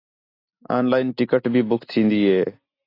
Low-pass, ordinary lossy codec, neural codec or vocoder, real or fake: 5.4 kHz; AAC, 32 kbps; none; real